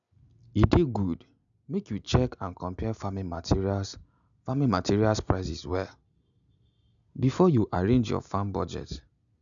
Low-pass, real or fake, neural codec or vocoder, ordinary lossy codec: 7.2 kHz; real; none; none